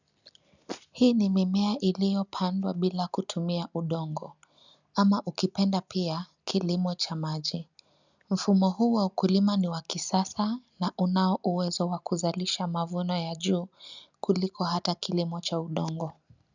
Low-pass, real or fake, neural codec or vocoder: 7.2 kHz; real; none